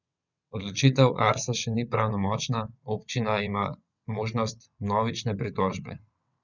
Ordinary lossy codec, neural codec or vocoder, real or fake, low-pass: none; vocoder, 22.05 kHz, 80 mel bands, WaveNeXt; fake; 7.2 kHz